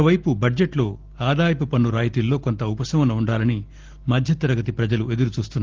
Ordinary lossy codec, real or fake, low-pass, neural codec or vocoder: Opus, 16 kbps; real; 7.2 kHz; none